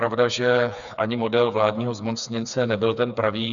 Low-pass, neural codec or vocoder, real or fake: 7.2 kHz; codec, 16 kHz, 4 kbps, FreqCodec, smaller model; fake